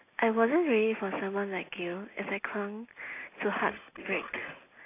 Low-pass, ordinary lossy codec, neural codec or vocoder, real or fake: 3.6 kHz; AAC, 24 kbps; none; real